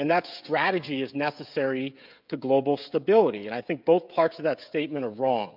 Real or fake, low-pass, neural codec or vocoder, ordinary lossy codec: fake; 5.4 kHz; codec, 16 kHz, 16 kbps, FreqCodec, smaller model; MP3, 48 kbps